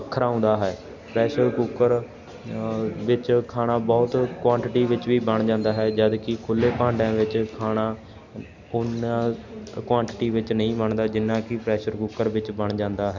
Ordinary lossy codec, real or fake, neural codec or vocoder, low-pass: none; real; none; 7.2 kHz